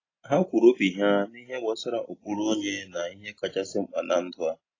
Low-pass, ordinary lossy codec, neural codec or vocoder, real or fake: 7.2 kHz; AAC, 32 kbps; none; real